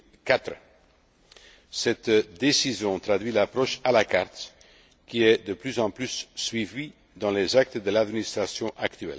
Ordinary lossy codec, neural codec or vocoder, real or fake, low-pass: none; none; real; none